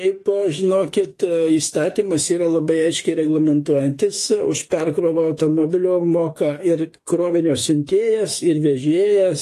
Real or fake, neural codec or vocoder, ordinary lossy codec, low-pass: fake; autoencoder, 48 kHz, 32 numbers a frame, DAC-VAE, trained on Japanese speech; AAC, 48 kbps; 14.4 kHz